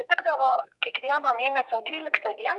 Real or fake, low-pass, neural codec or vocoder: fake; 10.8 kHz; codec, 32 kHz, 1.9 kbps, SNAC